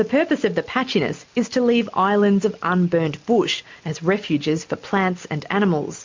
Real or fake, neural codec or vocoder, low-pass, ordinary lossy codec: real; none; 7.2 kHz; MP3, 48 kbps